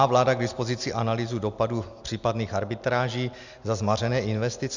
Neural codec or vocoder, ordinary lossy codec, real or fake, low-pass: none; Opus, 64 kbps; real; 7.2 kHz